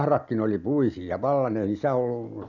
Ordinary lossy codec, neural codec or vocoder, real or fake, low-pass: none; none; real; 7.2 kHz